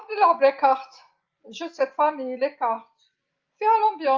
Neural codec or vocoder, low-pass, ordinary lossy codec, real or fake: none; 7.2 kHz; Opus, 32 kbps; real